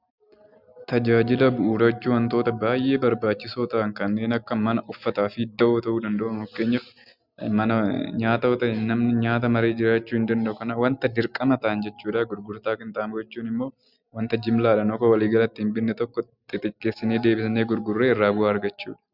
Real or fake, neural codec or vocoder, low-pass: real; none; 5.4 kHz